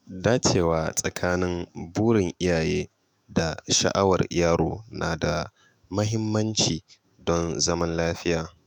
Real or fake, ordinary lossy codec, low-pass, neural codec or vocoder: fake; none; none; autoencoder, 48 kHz, 128 numbers a frame, DAC-VAE, trained on Japanese speech